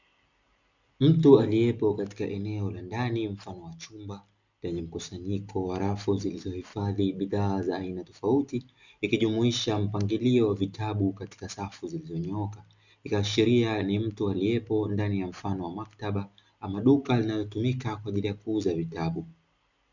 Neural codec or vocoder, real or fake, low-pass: none; real; 7.2 kHz